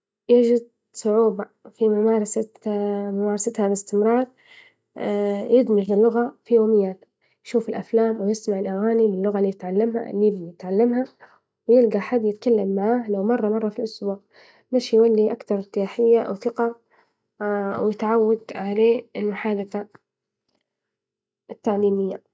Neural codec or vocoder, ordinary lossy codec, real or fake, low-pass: none; none; real; none